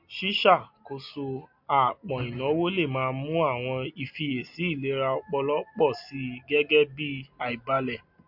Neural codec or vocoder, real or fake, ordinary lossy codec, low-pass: none; real; MP3, 48 kbps; 5.4 kHz